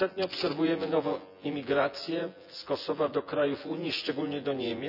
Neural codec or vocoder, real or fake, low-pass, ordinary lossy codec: vocoder, 24 kHz, 100 mel bands, Vocos; fake; 5.4 kHz; none